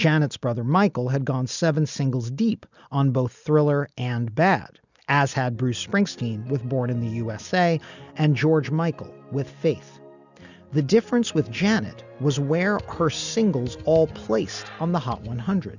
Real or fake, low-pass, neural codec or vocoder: real; 7.2 kHz; none